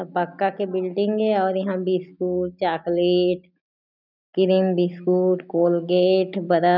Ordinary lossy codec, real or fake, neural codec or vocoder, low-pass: none; real; none; 5.4 kHz